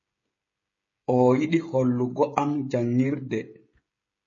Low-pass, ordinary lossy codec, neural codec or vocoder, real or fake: 7.2 kHz; MP3, 32 kbps; codec, 16 kHz, 16 kbps, FreqCodec, smaller model; fake